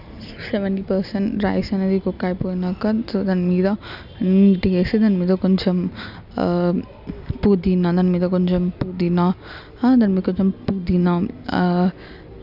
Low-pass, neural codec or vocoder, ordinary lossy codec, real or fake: 5.4 kHz; none; none; real